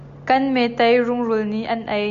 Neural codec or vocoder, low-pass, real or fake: none; 7.2 kHz; real